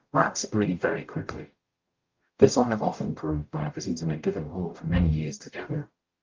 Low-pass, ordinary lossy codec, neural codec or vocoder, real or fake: 7.2 kHz; Opus, 24 kbps; codec, 44.1 kHz, 0.9 kbps, DAC; fake